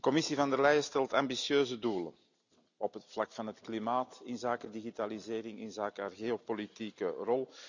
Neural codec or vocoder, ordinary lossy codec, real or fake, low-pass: none; none; real; 7.2 kHz